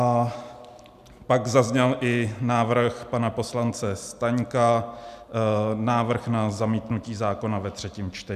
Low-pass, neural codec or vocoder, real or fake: 14.4 kHz; none; real